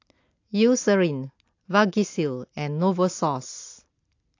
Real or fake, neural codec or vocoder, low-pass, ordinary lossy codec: real; none; 7.2 kHz; AAC, 48 kbps